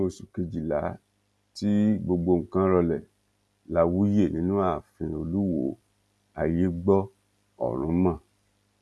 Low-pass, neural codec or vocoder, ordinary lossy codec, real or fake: none; none; none; real